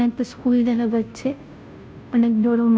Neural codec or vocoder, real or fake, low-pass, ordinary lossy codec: codec, 16 kHz, 0.5 kbps, FunCodec, trained on Chinese and English, 25 frames a second; fake; none; none